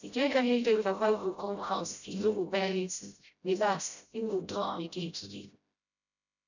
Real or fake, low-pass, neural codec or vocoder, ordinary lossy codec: fake; 7.2 kHz; codec, 16 kHz, 0.5 kbps, FreqCodec, smaller model; none